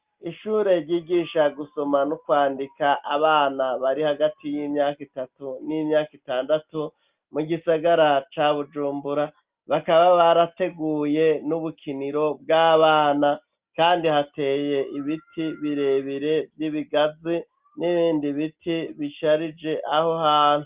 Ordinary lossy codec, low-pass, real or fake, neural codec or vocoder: Opus, 32 kbps; 3.6 kHz; real; none